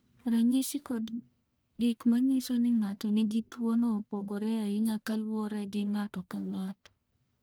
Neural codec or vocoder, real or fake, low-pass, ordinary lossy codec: codec, 44.1 kHz, 1.7 kbps, Pupu-Codec; fake; none; none